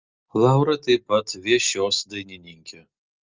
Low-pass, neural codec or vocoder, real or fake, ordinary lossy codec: 7.2 kHz; none; real; Opus, 32 kbps